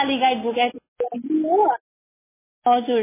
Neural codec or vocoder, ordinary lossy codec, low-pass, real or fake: none; MP3, 16 kbps; 3.6 kHz; real